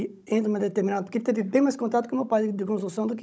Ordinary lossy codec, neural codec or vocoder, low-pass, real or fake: none; codec, 16 kHz, 16 kbps, FunCodec, trained on Chinese and English, 50 frames a second; none; fake